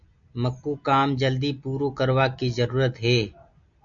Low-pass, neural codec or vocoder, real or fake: 7.2 kHz; none; real